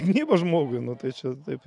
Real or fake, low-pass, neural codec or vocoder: real; 10.8 kHz; none